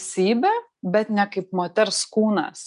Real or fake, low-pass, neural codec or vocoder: real; 10.8 kHz; none